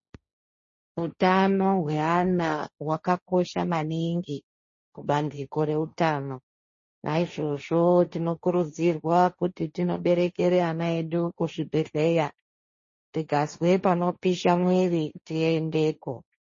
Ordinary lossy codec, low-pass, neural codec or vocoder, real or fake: MP3, 32 kbps; 7.2 kHz; codec, 16 kHz, 1.1 kbps, Voila-Tokenizer; fake